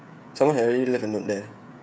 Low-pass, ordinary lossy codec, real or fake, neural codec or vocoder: none; none; fake; codec, 16 kHz, 16 kbps, FreqCodec, smaller model